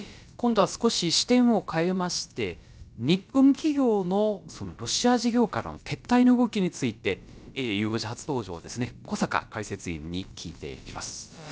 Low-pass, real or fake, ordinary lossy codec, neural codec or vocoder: none; fake; none; codec, 16 kHz, about 1 kbps, DyCAST, with the encoder's durations